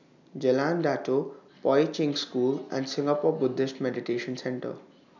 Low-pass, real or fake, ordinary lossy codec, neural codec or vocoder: 7.2 kHz; real; none; none